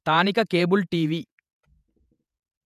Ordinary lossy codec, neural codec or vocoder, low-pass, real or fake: none; vocoder, 48 kHz, 128 mel bands, Vocos; 14.4 kHz; fake